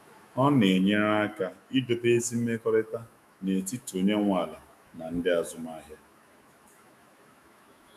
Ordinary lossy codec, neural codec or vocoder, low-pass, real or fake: none; autoencoder, 48 kHz, 128 numbers a frame, DAC-VAE, trained on Japanese speech; 14.4 kHz; fake